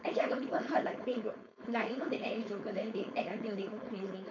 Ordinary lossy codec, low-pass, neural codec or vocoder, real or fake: none; 7.2 kHz; codec, 16 kHz, 4.8 kbps, FACodec; fake